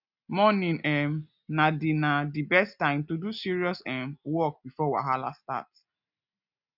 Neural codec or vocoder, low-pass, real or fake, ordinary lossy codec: none; 5.4 kHz; real; none